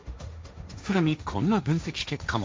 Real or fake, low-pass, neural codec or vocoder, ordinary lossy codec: fake; none; codec, 16 kHz, 1.1 kbps, Voila-Tokenizer; none